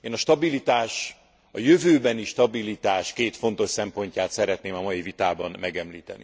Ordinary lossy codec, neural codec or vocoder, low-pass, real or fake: none; none; none; real